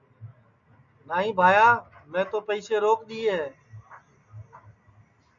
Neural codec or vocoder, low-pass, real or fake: none; 7.2 kHz; real